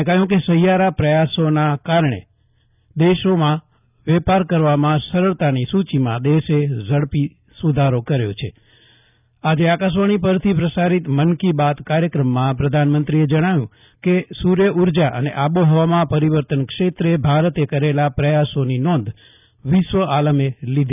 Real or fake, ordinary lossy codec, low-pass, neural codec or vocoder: real; none; 3.6 kHz; none